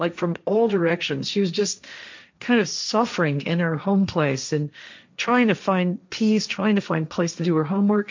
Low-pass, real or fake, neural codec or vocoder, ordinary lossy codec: 7.2 kHz; fake; codec, 16 kHz, 1.1 kbps, Voila-Tokenizer; MP3, 64 kbps